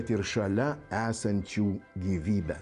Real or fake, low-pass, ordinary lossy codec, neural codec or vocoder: real; 10.8 kHz; MP3, 64 kbps; none